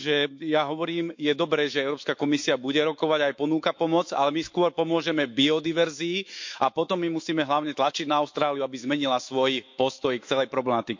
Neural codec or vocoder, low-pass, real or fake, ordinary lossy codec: autoencoder, 48 kHz, 128 numbers a frame, DAC-VAE, trained on Japanese speech; 7.2 kHz; fake; MP3, 48 kbps